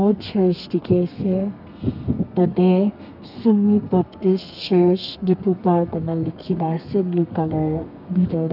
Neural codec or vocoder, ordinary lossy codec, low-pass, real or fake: codec, 32 kHz, 1.9 kbps, SNAC; none; 5.4 kHz; fake